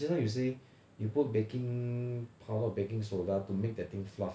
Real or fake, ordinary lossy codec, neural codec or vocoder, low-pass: real; none; none; none